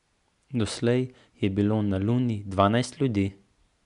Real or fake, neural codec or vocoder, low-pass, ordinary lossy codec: real; none; 10.8 kHz; MP3, 96 kbps